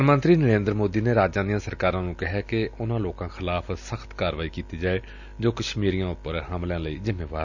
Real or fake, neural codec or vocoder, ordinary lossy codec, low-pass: real; none; none; 7.2 kHz